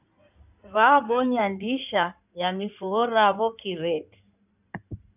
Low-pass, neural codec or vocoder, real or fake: 3.6 kHz; codec, 16 kHz in and 24 kHz out, 2.2 kbps, FireRedTTS-2 codec; fake